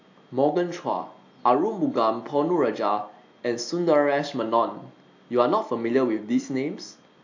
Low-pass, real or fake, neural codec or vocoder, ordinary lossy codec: 7.2 kHz; real; none; AAC, 48 kbps